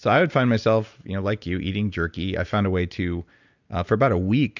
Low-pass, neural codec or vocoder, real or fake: 7.2 kHz; none; real